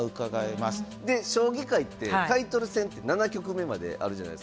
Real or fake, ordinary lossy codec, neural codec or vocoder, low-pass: real; none; none; none